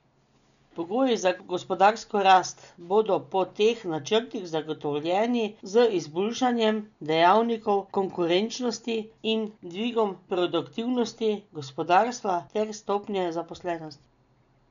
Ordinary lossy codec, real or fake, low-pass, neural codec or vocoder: none; real; 7.2 kHz; none